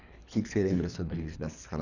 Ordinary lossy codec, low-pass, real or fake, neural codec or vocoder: none; 7.2 kHz; fake; codec, 24 kHz, 3 kbps, HILCodec